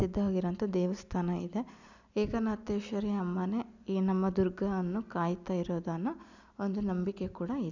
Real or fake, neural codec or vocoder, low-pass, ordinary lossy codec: real; none; 7.2 kHz; none